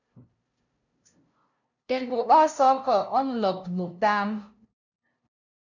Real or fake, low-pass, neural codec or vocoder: fake; 7.2 kHz; codec, 16 kHz, 0.5 kbps, FunCodec, trained on LibriTTS, 25 frames a second